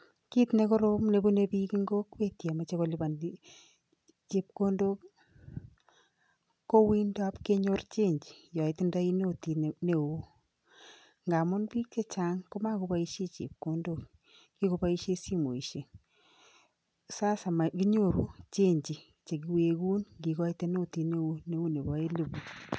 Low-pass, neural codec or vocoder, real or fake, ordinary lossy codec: none; none; real; none